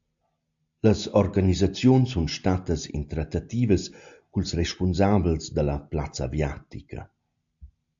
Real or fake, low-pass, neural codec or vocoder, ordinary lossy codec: real; 7.2 kHz; none; AAC, 64 kbps